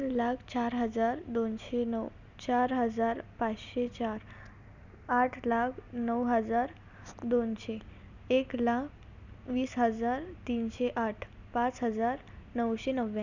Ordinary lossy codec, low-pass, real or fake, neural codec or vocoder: none; 7.2 kHz; real; none